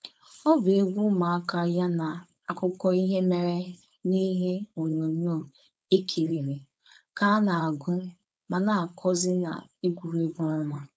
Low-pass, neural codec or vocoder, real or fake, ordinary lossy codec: none; codec, 16 kHz, 4.8 kbps, FACodec; fake; none